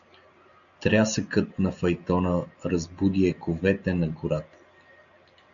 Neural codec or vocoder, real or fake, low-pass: none; real; 7.2 kHz